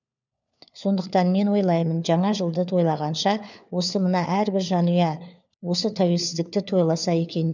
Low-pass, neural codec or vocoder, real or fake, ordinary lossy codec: 7.2 kHz; codec, 16 kHz, 4 kbps, FunCodec, trained on LibriTTS, 50 frames a second; fake; none